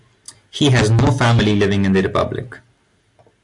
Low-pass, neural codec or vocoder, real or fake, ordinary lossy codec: 10.8 kHz; none; real; AAC, 64 kbps